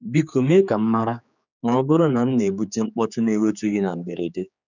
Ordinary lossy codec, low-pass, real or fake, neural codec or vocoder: none; 7.2 kHz; fake; codec, 16 kHz, 4 kbps, X-Codec, HuBERT features, trained on general audio